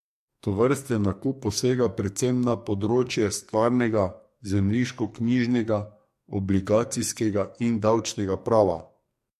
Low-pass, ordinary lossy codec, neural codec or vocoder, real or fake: 14.4 kHz; MP3, 64 kbps; codec, 44.1 kHz, 2.6 kbps, SNAC; fake